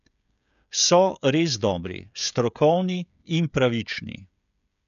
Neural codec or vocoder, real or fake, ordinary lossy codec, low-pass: codec, 16 kHz, 16 kbps, FreqCodec, smaller model; fake; none; 7.2 kHz